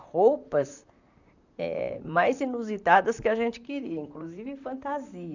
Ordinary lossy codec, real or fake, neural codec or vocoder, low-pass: none; fake; vocoder, 44.1 kHz, 128 mel bands, Pupu-Vocoder; 7.2 kHz